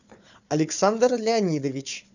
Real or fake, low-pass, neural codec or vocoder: fake; 7.2 kHz; codec, 16 kHz, 4 kbps, FunCodec, trained on Chinese and English, 50 frames a second